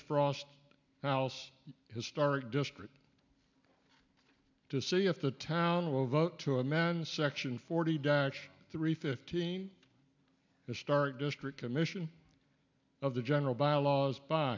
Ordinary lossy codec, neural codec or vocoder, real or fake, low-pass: MP3, 64 kbps; none; real; 7.2 kHz